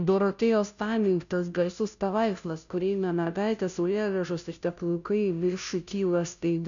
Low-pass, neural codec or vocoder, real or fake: 7.2 kHz; codec, 16 kHz, 0.5 kbps, FunCodec, trained on Chinese and English, 25 frames a second; fake